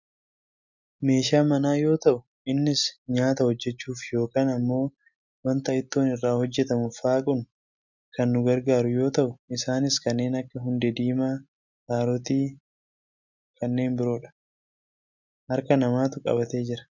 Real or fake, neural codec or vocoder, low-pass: real; none; 7.2 kHz